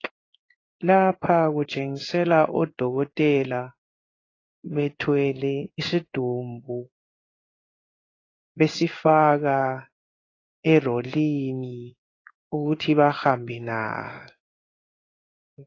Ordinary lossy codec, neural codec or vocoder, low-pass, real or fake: AAC, 32 kbps; codec, 16 kHz in and 24 kHz out, 1 kbps, XY-Tokenizer; 7.2 kHz; fake